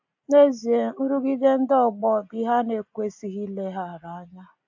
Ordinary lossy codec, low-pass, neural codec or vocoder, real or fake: AAC, 48 kbps; 7.2 kHz; none; real